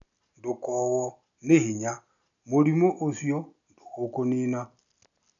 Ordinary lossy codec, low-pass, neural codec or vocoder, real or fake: none; 7.2 kHz; none; real